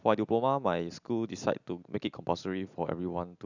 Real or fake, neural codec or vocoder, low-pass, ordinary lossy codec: real; none; 7.2 kHz; none